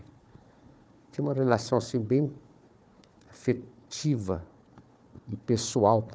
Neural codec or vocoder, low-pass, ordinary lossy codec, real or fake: codec, 16 kHz, 4 kbps, FunCodec, trained on Chinese and English, 50 frames a second; none; none; fake